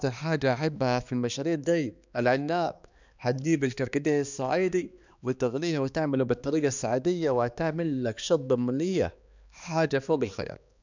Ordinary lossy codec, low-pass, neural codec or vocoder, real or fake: none; 7.2 kHz; codec, 16 kHz, 2 kbps, X-Codec, HuBERT features, trained on balanced general audio; fake